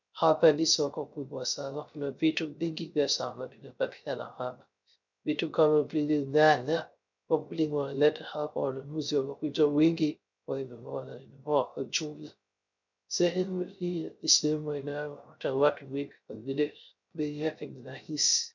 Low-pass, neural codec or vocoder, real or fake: 7.2 kHz; codec, 16 kHz, 0.3 kbps, FocalCodec; fake